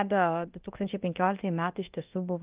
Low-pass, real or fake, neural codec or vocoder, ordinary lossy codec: 3.6 kHz; fake; codec, 24 kHz, 0.9 kbps, DualCodec; Opus, 32 kbps